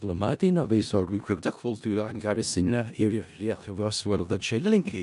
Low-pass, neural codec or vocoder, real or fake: 10.8 kHz; codec, 16 kHz in and 24 kHz out, 0.4 kbps, LongCat-Audio-Codec, four codebook decoder; fake